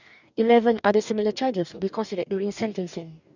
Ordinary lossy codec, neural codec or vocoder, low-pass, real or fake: none; codec, 44.1 kHz, 2.6 kbps, DAC; 7.2 kHz; fake